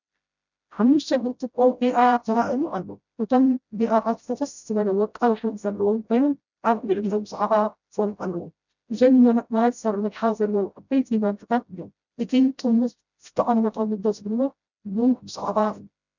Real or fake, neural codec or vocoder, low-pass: fake; codec, 16 kHz, 0.5 kbps, FreqCodec, smaller model; 7.2 kHz